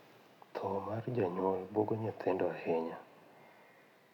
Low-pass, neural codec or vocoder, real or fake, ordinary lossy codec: 19.8 kHz; none; real; none